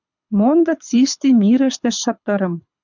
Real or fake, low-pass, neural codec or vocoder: fake; 7.2 kHz; codec, 24 kHz, 6 kbps, HILCodec